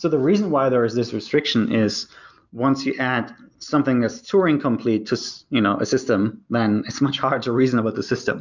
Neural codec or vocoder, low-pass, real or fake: none; 7.2 kHz; real